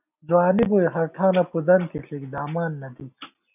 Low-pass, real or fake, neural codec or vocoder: 3.6 kHz; real; none